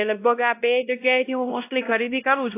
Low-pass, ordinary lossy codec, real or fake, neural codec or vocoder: 3.6 kHz; AAC, 24 kbps; fake; codec, 16 kHz, 0.5 kbps, X-Codec, WavLM features, trained on Multilingual LibriSpeech